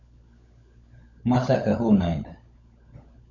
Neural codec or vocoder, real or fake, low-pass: codec, 16 kHz, 16 kbps, FunCodec, trained on LibriTTS, 50 frames a second; fake; 7.2 kHz